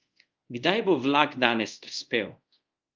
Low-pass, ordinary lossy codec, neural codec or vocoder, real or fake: 7.2 kHz; Opus, 24 kbps; codec, 24 kHz, 0.5 kbps, DualCodec; fake